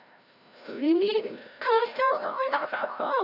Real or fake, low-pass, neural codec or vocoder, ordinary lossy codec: fake; 5.4 kHz; codec, 16 kHz, 0.5 kbps, FreqCodec, larger model; none